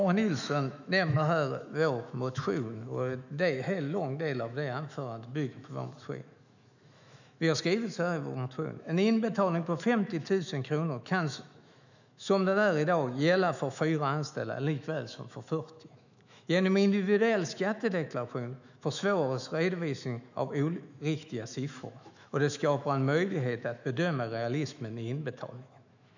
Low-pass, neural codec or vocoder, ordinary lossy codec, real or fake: 7.2 kHz; autoencoder, 48 kHz, 128 numbers a frame, DAC-VAE, trained on Japanese speech; none; fake